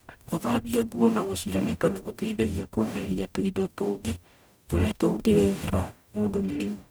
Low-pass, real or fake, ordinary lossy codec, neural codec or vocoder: none; fake; none; codec, 44.1 kHz, 0.9 kbps, DAC